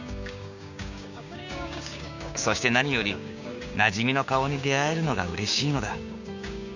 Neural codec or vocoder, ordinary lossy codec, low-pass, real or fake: codec, 16 kHz, 6 kbps, DAC; none; 7.2 kHz; fake